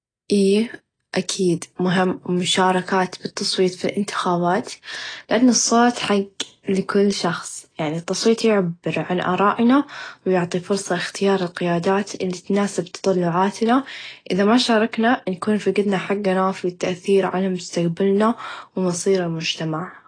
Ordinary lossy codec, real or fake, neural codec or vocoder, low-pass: AAC, 32 kbps; real; none; 9.9 kHz